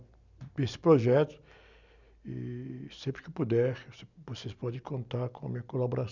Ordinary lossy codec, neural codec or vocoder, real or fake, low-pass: none; none; real; 7.2 kHz